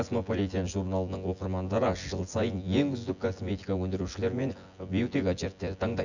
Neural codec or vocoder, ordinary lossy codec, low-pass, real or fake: vocoder, 24 kHz, 100 mel bands, Vocos; none; 7.2 kHz; fake